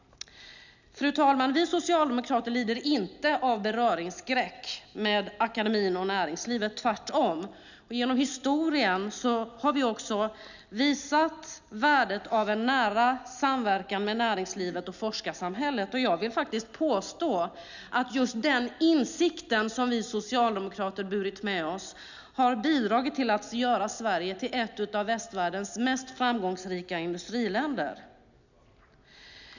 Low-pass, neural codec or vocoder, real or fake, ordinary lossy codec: 7.2 kHz; none; real; none